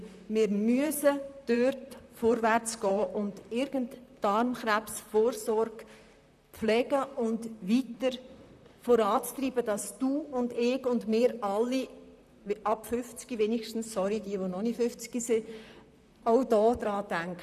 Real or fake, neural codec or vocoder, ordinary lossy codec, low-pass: fake; vocoder, 44.1 kHz, 128 mel bands, Pupu-Vocoder; none; 14.4 kHz